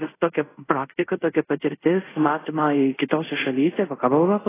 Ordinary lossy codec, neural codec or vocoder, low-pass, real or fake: AAC, 16 kbps; codec, 24 kHz, 0.5 kbps, DualCodec; 3.6 kHz; fake